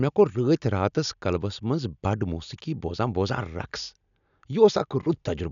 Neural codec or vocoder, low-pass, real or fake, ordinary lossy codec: none; 7.2 kHz; real; none